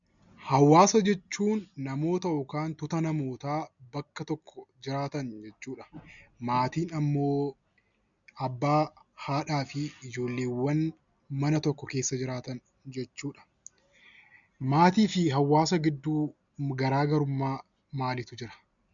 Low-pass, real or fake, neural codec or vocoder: 7.2 kHz; real; none